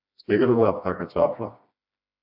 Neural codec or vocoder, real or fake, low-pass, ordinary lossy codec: codec, 16 kHz, 1 kbps, FreqCodec, smaller model; fake; 5.4 kHz; AAC, 48 kbps